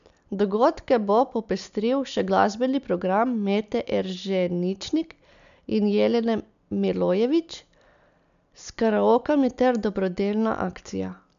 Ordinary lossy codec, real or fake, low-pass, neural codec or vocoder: none; real; 7.2 kHz; none